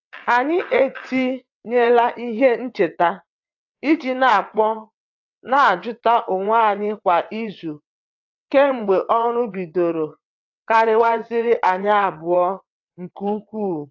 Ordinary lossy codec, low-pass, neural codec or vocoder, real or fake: AAC, 48 kbps; 7.2 kHz; vocoder, 22.05 kHz, 80 mel bands, WaveNeXt; fake